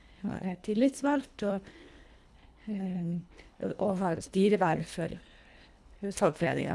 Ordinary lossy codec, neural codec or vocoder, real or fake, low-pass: none; codec, 24 kHz, 1.5 kbps, HILCodec; fake; none